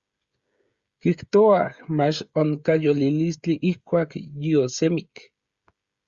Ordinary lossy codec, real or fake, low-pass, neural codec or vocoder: Opus, 64 kbps; fake; 7.2 kHz; codec, 16 kHz, 16 kbps, FreqCodec, smaller model